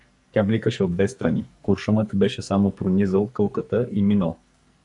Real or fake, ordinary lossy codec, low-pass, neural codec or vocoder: fake; MP3, 96 kbps; 10.8 kHz; codec, 44.1 kHz, 2.6 kbps, SNAC